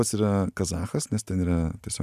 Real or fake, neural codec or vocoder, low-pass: real; none; 14.4 kHz